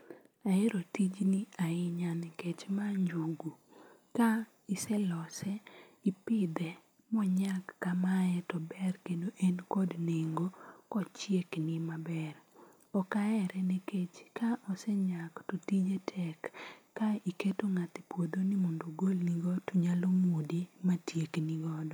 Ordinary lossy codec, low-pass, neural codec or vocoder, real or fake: none; none; none; real